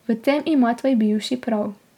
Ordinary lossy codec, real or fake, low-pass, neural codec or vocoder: none; real; 19.8 kHz; none